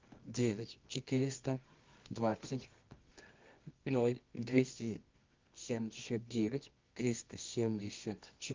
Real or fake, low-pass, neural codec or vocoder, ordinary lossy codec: fake; 7.2 kHz; codec, 24 kHz, 0.9 kbps, WavTokenizer, medium music audio release; Opus, 24 kbps